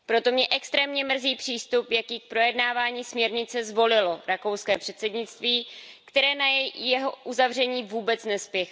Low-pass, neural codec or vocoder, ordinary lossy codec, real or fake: none; none; none; real